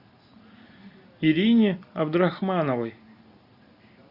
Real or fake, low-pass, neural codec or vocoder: real; 5.4 kHz; none